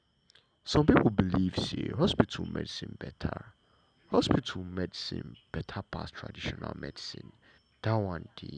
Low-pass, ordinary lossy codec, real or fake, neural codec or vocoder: 9.9 kHz; none; real; none